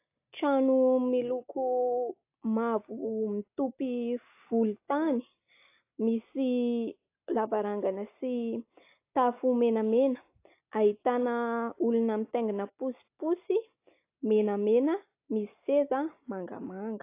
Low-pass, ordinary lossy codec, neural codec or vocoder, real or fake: 3.6 kHz; AAC, 24 kbps; none; real